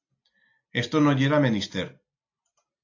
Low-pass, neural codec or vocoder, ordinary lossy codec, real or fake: 7.2 kHz; none; AAC, 48 kbps; real